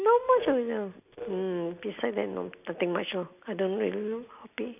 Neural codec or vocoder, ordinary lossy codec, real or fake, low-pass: none; none; real; 3.6 kHz